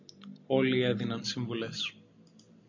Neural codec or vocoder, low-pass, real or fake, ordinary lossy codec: none; 7.2 kHz; real; MP3, 48 kbps